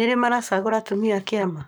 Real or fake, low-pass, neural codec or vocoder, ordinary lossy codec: fake; none; codec, 44.1 kHz, 7.8 kbps, Pupu-Codec; none